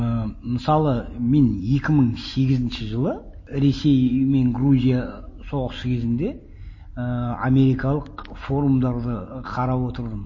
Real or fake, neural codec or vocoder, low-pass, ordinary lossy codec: real; none; 7.2 kHz; MP3, 32 kbps